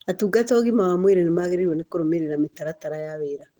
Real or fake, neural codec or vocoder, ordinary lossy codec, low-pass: real; none; Opus, 16 kbps; 19.8 kHz